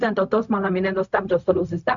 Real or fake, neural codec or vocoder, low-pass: fake; codec, 16 kHz, 0.4 kbps, LongCat-Audio-Codec; 7.2 kHz